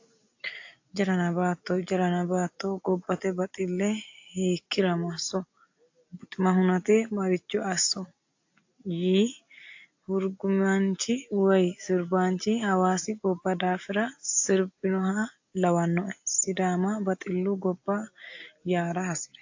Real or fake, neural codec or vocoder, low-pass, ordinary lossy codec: real; none; 7.2 kHz; AAC, 48 kbps